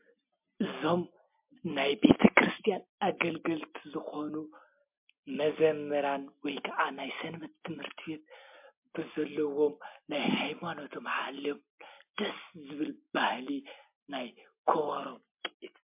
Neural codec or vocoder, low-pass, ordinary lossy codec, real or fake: none; 3.6 kHz; MP3, 32 kbps; real